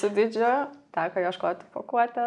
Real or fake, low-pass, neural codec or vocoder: real; 10.8 kHz; none